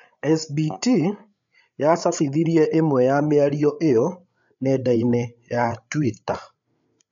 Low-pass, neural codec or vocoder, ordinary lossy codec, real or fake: 7.2 kHz; codec, 16 kHz, 8 kbps, FreqCodec, larger model; none; fake